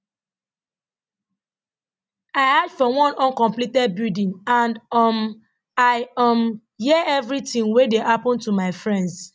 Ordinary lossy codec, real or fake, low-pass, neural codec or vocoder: none; real; none; none